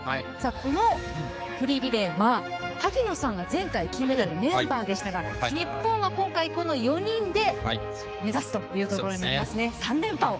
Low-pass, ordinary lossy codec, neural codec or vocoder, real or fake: none; none; codec, 16 kHz, 4 kbps, X-Codec, HuBERT features, trained on general audio; fake